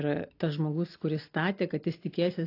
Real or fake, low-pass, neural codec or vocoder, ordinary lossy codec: real; 5.4 kHz; none; AAC, 32 kbps